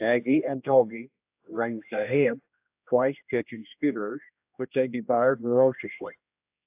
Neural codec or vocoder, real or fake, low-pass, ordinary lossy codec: codec, 16 kHz, 1 kbps, X-Codec, HuBERT features, trained on general audio; fake; 3.6 kHz; AAC, 32 kbps